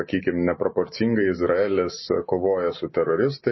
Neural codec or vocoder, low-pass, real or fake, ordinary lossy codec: none; 7.2 kHz; real; MP3, 24 kbps